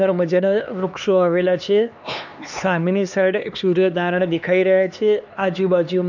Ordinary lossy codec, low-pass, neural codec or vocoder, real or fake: none; 7.2 kHz; codec, 16 kHz, 2 kbps, X-Codec, HuBERT features, trained on LibriSpeech; fake